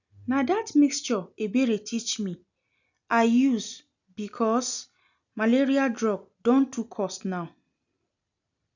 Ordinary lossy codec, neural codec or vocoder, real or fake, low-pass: none; none; real; 7.2 kHz